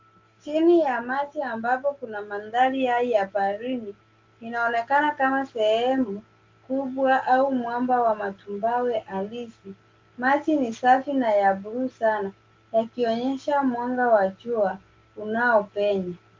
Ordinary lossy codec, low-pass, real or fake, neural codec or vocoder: Opus, 32 kbps; 7.2 kHz; real; none